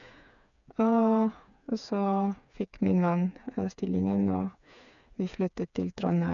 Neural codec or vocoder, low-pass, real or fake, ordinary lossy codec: codec, 16 kHz, 4 kbps, FreqCodec, smaller model; 7.2 kHz; fake; none